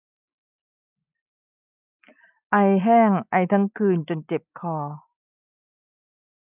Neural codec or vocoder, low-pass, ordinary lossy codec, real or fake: none; 3.6 kHz; none; real